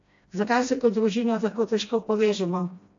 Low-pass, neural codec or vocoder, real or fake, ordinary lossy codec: 7.2 kHz; codec, 16 kHz, 1 kbps, FreqCodec, smaller model; fake; AAC, 48 kbps